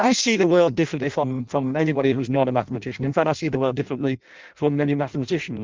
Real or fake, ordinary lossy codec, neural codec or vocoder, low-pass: fake; Opus, 32 kbps; codec, 16 kHz in and 24 kHz out, 0.6 kbps, FireRedTTS-2 codec; 7.2 kHz